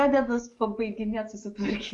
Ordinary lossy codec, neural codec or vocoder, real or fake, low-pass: Opus, 64 kbps; codec, 44.1 kHz, 7.8 kbps, DAC; fake; 10.8 kHz